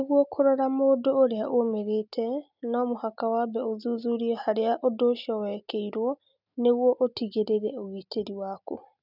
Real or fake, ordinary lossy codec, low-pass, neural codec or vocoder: real; none; 5.4 kHz; none